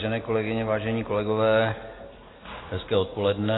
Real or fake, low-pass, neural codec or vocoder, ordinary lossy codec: real; 7.2 kHz; none; AAC, 16 kbps